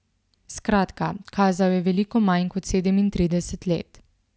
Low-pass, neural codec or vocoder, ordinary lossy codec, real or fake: none; none; none; real